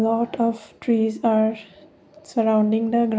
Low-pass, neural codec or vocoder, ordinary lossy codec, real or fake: none; none; none; real